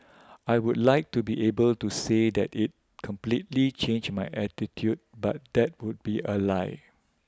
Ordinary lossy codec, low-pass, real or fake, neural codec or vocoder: none; none; real; none